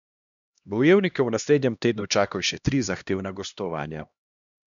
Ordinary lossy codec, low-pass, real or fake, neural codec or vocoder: none; 7.2 kHz; fake; codec, 16 kHz, 1 kbps, X-Codec, HuBERT features, trained on LibriSpeech